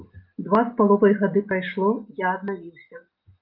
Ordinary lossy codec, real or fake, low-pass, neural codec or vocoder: Opus, 32 kbps; real; 5.4 kHz; none